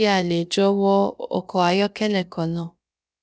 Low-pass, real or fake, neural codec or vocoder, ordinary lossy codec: none; fake; codec, 16 kHz, about 1 kbps, DyCAST, with the encoder's durations; none